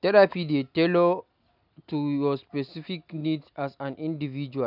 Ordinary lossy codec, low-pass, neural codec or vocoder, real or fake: none; 5.4 kHz; none; real